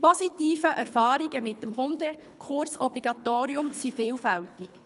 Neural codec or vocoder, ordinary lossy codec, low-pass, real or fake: codec, 24 kHz, 3 kbps, HILCodec; none; 10.8 kHz; fake